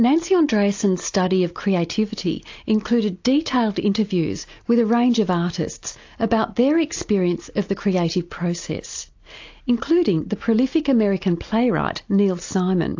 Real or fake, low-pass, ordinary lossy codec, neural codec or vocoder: real; 7.2 kHz; AAC, 48 kbps; none